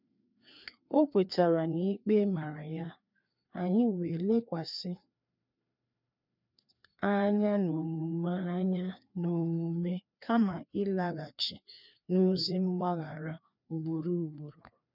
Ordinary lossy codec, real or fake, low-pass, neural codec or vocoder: none; fake; 5.4 kHz; codec, 16 kHz, 2 kbps, FreqCodec, larger model